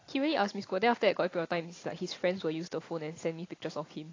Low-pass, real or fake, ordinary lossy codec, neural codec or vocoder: 7.2 kHz; real; AAC, 32 kbps; none